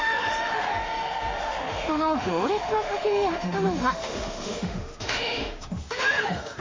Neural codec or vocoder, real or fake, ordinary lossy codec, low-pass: autoencoder, 48 kHz, 32 numbers a frame, DAC-VAE, trained on Japanese speech; fake; MP3, 48 kbps; 7.2 kHz